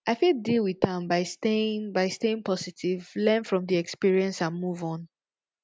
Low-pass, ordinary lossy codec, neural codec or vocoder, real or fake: none; none; none; real